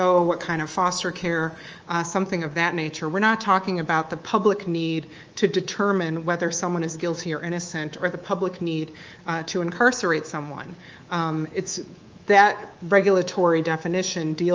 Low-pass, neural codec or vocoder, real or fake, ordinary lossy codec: 7.2 kHz; codec, 24 kHz, 3.1 kbps, DualCodec; fake; Opus, 24 kbps